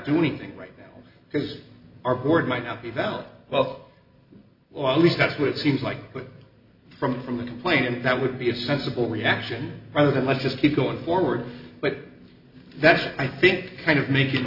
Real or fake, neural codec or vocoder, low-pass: real; none; 5.4 kHz